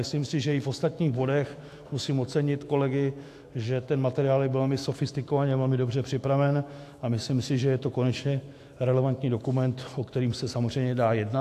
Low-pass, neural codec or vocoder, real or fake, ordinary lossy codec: 14.4 kHz; autoencoder, 48 kHz, 128 numbers a frame, DAC-VAE, trained on Japanese speech; fake; AAC, 64 kbps